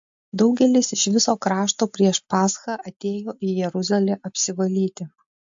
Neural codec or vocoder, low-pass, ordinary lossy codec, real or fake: none; 7.2 kHz; MP3, 48 kbps; real